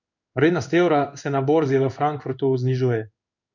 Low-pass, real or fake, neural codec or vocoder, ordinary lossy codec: 7.2 kHz; fake; codec, 16 kHz in and 24 kHz out, 1 kbps, XY-Tokenizer; none